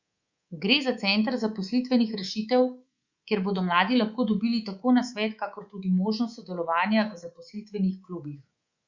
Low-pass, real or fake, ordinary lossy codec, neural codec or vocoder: 7.2 kHz; fake; Opus, 64 kbps; codec, 24 kHz, 3.1 kbps, DualCodec